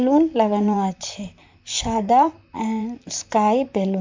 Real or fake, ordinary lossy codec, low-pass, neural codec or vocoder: fake; MP3, 64 kbps; 7.2 kHz; vocoder, 44.1 kHz, 128 mel bands, Pupu-Vocoder